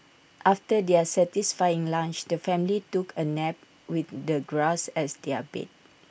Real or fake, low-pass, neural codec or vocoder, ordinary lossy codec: real; none; none; none